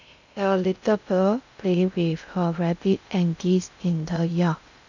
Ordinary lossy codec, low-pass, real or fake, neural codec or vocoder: none; 7.2 kHz; fake; codec, 16 kHz in and 24 kHz out, 0.6 kbps, FocalCodec, streaming, 2048 codes